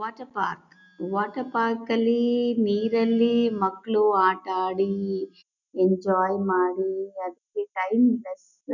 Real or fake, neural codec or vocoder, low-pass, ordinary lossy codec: real; none; 7.2 kHz; none